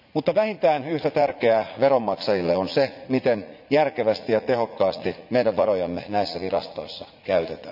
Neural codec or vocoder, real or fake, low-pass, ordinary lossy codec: vocoder, 44.1 kHz, 80 mel bands, Vocos; fake; 5.4 kHz; none